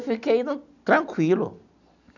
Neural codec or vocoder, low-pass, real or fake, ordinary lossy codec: none; 7.2 kHz; real; none